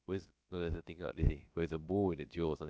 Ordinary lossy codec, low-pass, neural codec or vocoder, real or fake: none; none; codec, 16 kHz, about 1 kbps, DyCAST, with the encoder's durations; fake